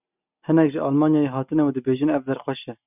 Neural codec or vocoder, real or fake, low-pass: none; real; 3.6 kHz